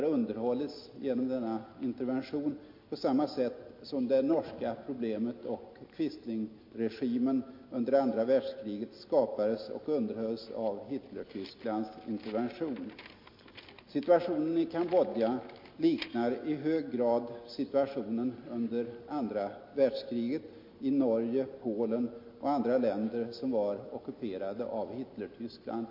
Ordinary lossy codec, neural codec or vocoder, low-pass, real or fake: MP3, 32 kbps; none; 5.4 kHz; real